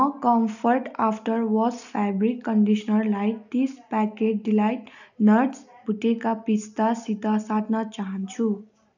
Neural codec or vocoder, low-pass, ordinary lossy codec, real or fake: none; 7.2 kHz; none; real